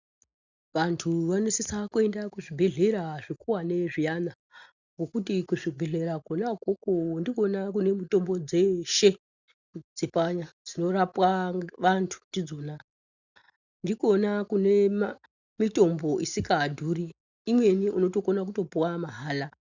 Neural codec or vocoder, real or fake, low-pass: none; real; 7.2 kHz